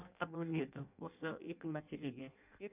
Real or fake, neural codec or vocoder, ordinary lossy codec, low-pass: fake; codec, 16 kHz in and 24 kHz out, 0.6 kbps, FireRedTTS-2 codec; none; 3.6 kHz